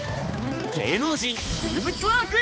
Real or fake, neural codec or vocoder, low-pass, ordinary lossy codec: fake; codec, 16 kHz, 2 kbps, X-Codec, HuBERT features, trained on balanced general audio; none; none